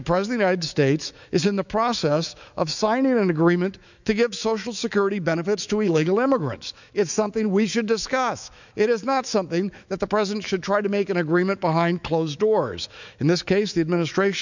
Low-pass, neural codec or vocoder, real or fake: 7.2 kHz; autoencoder, 48 kHz, 128 numbers a frame, DAC-VAE, trained on Japanese speech; fake